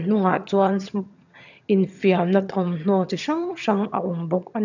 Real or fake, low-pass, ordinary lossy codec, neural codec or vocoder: fake; 7.2 kHz; none; vocoder, 22.05 kHz, 80 mel bands, HiFi-GAN